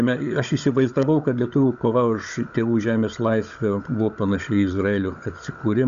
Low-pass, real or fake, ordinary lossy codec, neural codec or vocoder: 7.2 kHz; fake; Opus, 64 kbps; codec, 16 kHz, 16 kbps, FunCodec, trained on Chinese and English, 50 frames a second